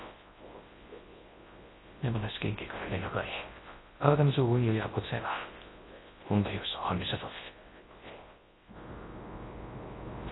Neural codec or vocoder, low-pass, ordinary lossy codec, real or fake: codec, 24 kHz, 0.9 kbps, WavTokenizer, large speech release; 7.2 kHz; AAC, 16 kbps; fake